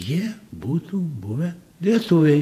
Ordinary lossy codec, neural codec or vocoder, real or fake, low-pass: AAC, 64 kbps; vocoder, 48 kHz, 128 mel bands, Vocos; fake; 14.4 kHz